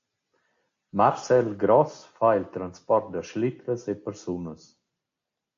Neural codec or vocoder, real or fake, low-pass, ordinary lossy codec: none; real; 7.2 kHz; AAC, 48 kbps